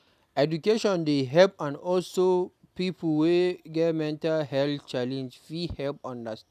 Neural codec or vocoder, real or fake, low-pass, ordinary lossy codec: none; real; 14.4 kHz; none